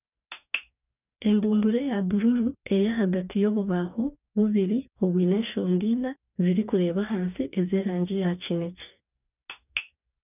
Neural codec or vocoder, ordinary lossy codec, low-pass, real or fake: codec, 44.1 kHz, 2.6 kbps, DAC; none; 3.6 kHz; fake